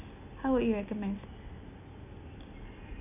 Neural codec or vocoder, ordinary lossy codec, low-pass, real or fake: none; none; 3.6 kHz; real